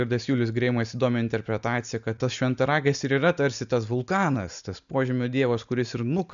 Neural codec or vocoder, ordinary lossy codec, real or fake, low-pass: none; MP3, 96 kbps; real; 7.2 kHz